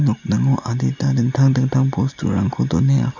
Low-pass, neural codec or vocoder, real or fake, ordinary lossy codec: 7.2 kHz; none; real; none